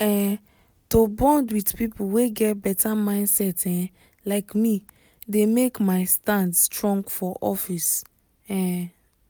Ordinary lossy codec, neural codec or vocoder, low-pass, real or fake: none; none; none; real